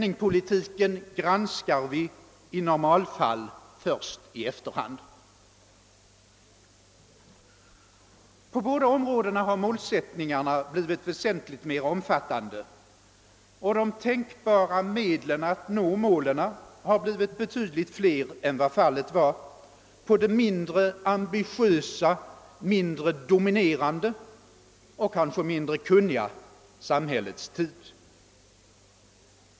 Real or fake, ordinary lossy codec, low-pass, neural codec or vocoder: real; none; none; none